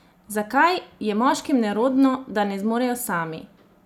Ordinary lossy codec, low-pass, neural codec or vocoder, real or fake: none; 19.8 kHz; none; real